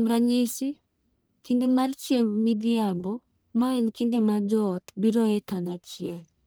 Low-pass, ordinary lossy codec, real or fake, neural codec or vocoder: none; none; fake; codec, 44.1 kHz, 1.7 kbps, Pupu-Codec